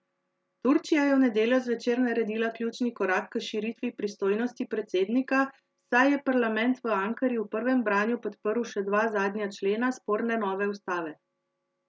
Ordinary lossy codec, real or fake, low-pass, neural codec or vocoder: none; real; none; none